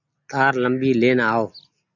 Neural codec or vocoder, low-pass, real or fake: none; 7.2 kHz; real